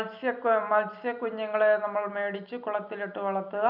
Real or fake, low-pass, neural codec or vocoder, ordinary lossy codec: real; 5.4 kHz; none; none